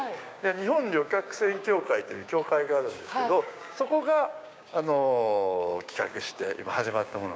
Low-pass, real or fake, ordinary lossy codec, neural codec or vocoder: none; fake; none; codec, 16 kHz, 6 kbps, DAC